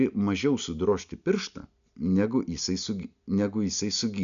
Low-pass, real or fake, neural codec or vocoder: 7.2 kHz; real; none